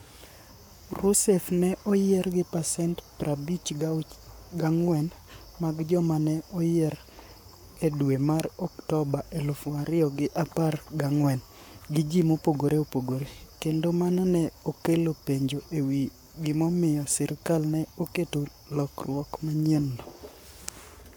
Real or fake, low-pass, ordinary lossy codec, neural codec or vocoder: fake; none; none; codec, 44.1 kHz, 7.8 kbps, Pupu-Codec